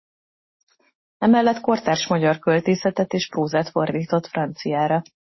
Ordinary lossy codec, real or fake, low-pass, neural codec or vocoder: MP3, 24 kbps; real; 7.2 kHz; none